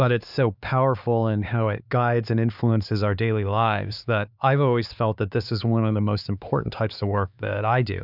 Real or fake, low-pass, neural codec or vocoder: fake; 5.4 kHz; codec, 16 kHz, 4 kbps, X-Codec, HuBERT features, trained on LibriSpeech